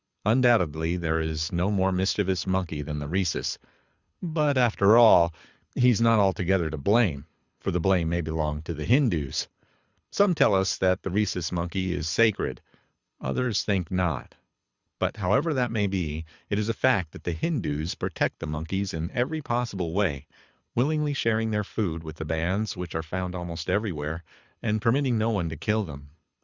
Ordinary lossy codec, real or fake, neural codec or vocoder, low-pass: Opus, 64 kbps; fake; codec, 24 kHz, 6 kbps, HILCodec; 7.2 kHz